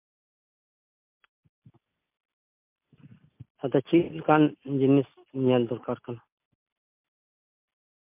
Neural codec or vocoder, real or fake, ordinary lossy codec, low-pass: none; real; MP3, 32 kbps; 3.6 kHz